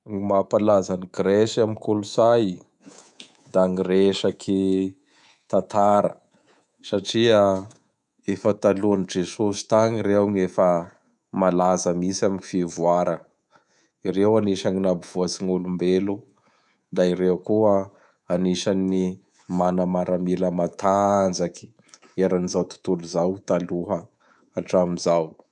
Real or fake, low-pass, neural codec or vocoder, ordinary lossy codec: fake; 10.8 kHz; codec, 24 kHz, 3.1 kbps, DualCodec; none